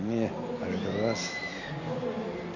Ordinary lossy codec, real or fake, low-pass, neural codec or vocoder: none; real; 7.2 kHz; none